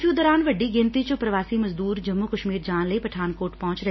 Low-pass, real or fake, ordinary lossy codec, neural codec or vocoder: 7.2 kHz; real; MP3, 24 kbps; none